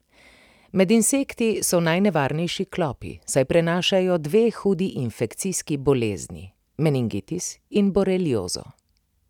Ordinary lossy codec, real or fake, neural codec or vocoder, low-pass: none; real; none; 19.8 kHz